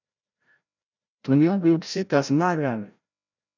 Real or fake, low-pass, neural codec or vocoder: fake; 7.2 kHz; codec, 16 kHz, 0.5 kbps, FreqCodec, larger model